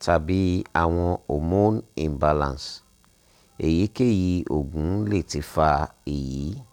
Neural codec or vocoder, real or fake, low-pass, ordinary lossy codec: none; real; 19.8 kHz; none